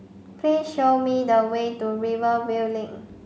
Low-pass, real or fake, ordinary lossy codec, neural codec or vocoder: none; real; none; none